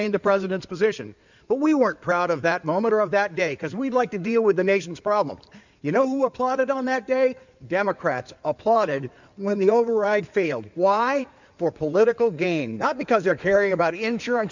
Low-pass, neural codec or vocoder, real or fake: 7.2 kHz; codec, 16 kHz in and 24 kHz out, 2.2 kbps, FireRedTTS-2 codec; fake